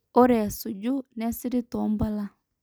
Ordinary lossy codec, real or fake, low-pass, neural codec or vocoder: none; real; none; none